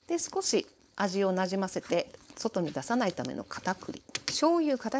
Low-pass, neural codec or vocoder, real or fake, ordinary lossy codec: none; codec, 16 kHz, 4.8 kbps, FACodec; fake; none